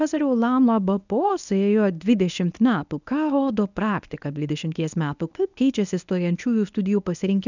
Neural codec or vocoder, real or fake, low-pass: codec, 24 kHz, 0.9 kbps, WavTokenizer, medium speech release version 1; fake; 7.2 kHz